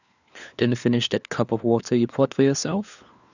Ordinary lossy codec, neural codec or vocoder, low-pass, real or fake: none; codec, 16 kHz, 4 kbps, FunCodec, trained on LibriTTS, 50 frames a second; 7.2 kHz; fake